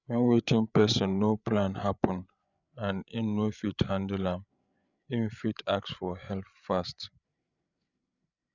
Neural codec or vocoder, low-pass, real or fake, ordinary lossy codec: codec, 16 kHz, 8 kbps, FreqCodec, larger model; 7.2 kHz; fake; none